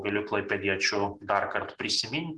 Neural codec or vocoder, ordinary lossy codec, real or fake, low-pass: none; Opus, 16 kbps; real; 10.8 kHz